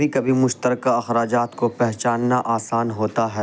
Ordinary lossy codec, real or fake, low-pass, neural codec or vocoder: none; real; none; none